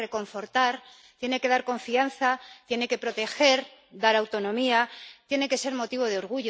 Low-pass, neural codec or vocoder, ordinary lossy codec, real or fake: none; none; none; real